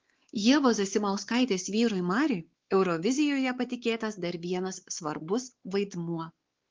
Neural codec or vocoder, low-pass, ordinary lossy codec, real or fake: codec, 16 kHz, 4 kbps, X-Codec, WavLM features, trained on Multilingual LibriSpeech; 7.2 kHz; Opus, 16 kbps; fake